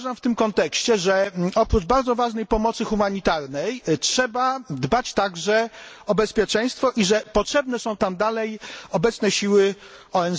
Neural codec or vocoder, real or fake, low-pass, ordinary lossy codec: none; real; none; none